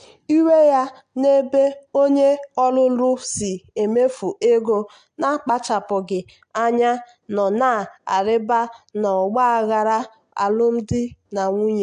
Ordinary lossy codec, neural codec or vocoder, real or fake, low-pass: AAC, 64 kbps; none; real; 9.9 kHz